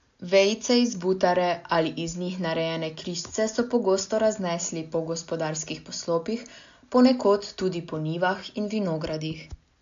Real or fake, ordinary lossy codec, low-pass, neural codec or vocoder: real; AAC, 64 kbps; 7.2 kHz; none